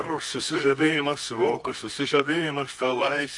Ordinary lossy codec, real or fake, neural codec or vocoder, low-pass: MP3, 48 kbps; fake; codec, 24 kHz, 0.9 kbps, WavTokenizer, medium music audio release; 10.8 kHz